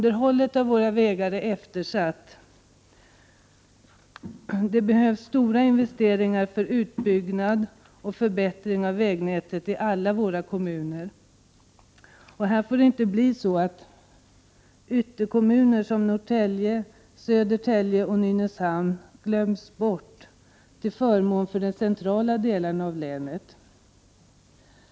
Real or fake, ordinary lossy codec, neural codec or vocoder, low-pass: real; none; none; none